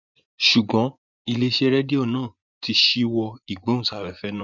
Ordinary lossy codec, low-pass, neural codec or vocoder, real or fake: none; 7.2 kHz; vocoder, 24 kHz, 100 mel bands, Vocos; fake